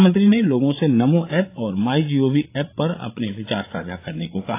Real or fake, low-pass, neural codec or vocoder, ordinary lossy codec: fake; 3.6 kHz; codec, 16 kHz, 8 kbps, FreqCodec, larger model; AAC, 24 kbps